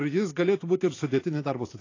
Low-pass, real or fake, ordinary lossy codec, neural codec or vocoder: 7.2 kHz; fake; AAC, 32 kbps; vocoder, 44.1 kHz, 128 mel bands every 512 samples, BigVGAN v2